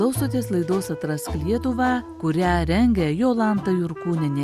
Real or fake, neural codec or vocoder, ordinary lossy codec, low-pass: real; none; Opus, 64 kbps; 14.4 kHz